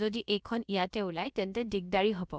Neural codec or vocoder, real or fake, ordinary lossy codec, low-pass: codec, 16 kHz, about 1 kbps, DyCAST, with the encoder's durations; fake; none; none